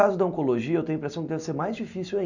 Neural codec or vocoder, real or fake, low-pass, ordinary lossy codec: none; real; 7.2 kHz; none